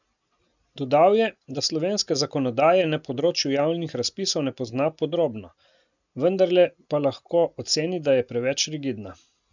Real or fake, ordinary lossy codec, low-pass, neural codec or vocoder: real; none; 7.2 kHz; none